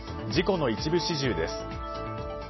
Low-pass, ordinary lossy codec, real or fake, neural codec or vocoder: 7.2 kHz; MP3, 24 kbps; real; none